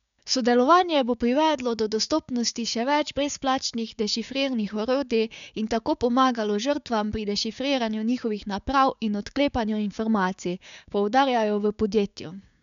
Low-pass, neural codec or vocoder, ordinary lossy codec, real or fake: 7.2 kHz; codec, 16 kHz, 6 kbps, DAC; none; fake